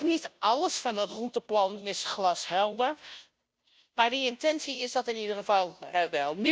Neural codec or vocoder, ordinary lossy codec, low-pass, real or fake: codec, 16 kHz, 0.5 kbps, FunCodec, trained on Chinese and English, 25 frames a second; none; none; fake